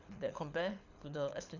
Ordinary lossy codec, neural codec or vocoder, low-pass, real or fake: none; codec, 24 kHz, 6 kbps, HILCodec; 7.2 kHz; fake